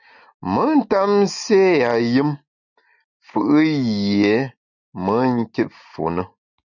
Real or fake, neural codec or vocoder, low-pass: real; none; 7.2 kHz